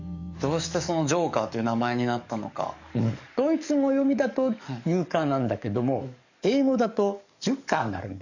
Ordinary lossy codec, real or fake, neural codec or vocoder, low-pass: none; fake; codec, 44.1 kHz, 7.8 kbps, DAC; 7.2 kHz